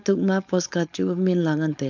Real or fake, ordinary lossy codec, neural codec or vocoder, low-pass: fake; none; codec, 16 kHz, 4.8 kbps, FACodec; 7.2 kHz